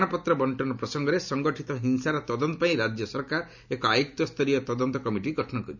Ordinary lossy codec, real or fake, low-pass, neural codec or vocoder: none; real; 7.2 kHz; none